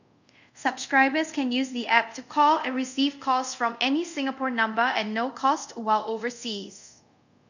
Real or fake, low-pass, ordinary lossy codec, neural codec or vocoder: fake; 7.2 kHz; none; codec, 24 kHz, 0.5 kbps, DualCodec